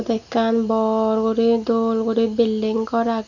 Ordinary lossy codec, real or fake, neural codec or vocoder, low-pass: none; real; none; 7.2 kHz